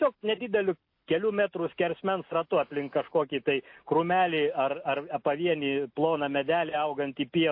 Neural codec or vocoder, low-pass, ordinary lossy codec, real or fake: none; 5.4 kHz; MP3, 32 kbps; real